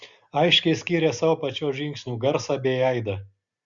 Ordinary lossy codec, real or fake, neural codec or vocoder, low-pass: Opus, 64 kbps; real; none; 7.2 kHz